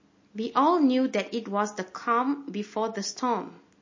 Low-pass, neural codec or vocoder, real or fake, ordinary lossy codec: 7.2 kHz; none; real; MP3, 32 kbps